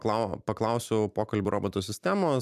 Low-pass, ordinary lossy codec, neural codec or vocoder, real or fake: 14.4 kHz; MP3, 96 kbps; vocoder, 44.1 kHz, 128 mel bands every 256 samples, BigVGAN v2; fake